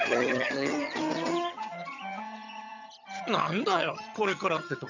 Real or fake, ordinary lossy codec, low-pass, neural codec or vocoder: fake; none; 7.2 kHz; vocoder, 22.05 kHz, 80 mel bands, HiFi-GAN